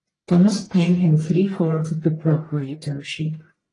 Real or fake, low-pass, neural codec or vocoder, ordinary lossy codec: fake; 10.8 kHz; codec, 44.1 kHz, 1.7 kbps, Pupu-Codec; AAC, 32 kbps